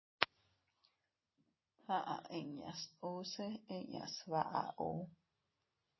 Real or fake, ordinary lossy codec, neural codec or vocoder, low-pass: real; MP3, 24 kbps; none; 7.2 kHz